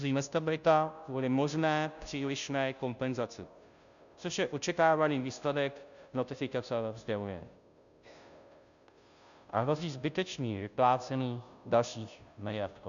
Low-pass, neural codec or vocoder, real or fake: 7.2 kHz; codec, 16 kHz, 0.5 kbps, FunCodec, trained on Chinese and English, 25 frames a second; fake